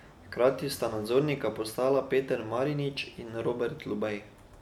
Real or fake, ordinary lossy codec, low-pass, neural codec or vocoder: real; none; 19.8 kHz; none